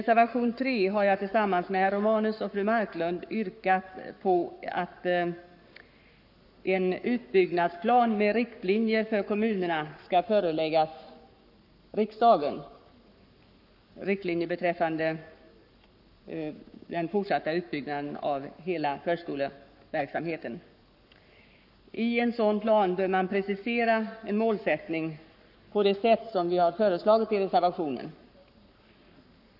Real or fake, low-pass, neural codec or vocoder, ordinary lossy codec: fake; 5.4 kHz; codec, 44.1 kHz, 7.8 kbps, Pupu-Codec; none